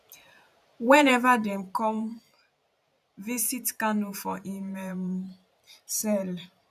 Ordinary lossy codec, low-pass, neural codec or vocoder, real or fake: none; 14.4 kHz; vocoder, 44.1 kHz, 128 mel bands every 512 samples, BigVGAN v2; fake